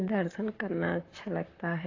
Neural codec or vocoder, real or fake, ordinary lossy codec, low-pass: vocoder, 44.1 kHz, 128 mel bands, Pupu-Vocoder; fake; AAC, 32 kbps; 7.2 kHz